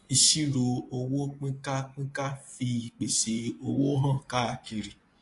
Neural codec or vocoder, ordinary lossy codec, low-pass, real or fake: vocoder, 24 kHz, 100 mel bands, Vocos; AAC, 48 kbps; 10.8 kHz; fake